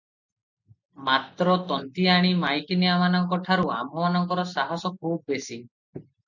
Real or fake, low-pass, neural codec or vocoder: real; 7.2 kHz; none